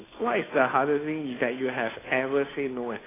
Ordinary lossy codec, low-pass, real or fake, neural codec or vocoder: AAC, 16 kbps; 3.6 kHz; fake; codec, 16 kHz, 2 kbps, FunCodec, trained on Chinese and English, 25 frames a second